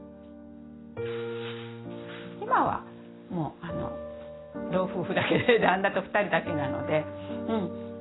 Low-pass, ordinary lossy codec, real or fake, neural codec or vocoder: 7.2 kHz; AAC, 16 kbps; real; none